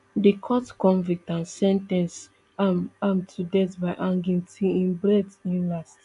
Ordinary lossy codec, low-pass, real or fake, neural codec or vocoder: AAC, 96 kbps; 10.8 kHz; real; none